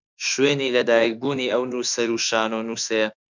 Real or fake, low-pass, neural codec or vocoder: fake; 7.2 kHz; autoencoder, 48 kHz, 32 numbers a frame, DAC-VAE, trained on Japanese speech